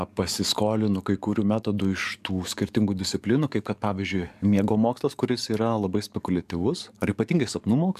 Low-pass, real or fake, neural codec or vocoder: 14.4 kHz; fake; codec, 44.1 kHz, 7.8 kbps, DAC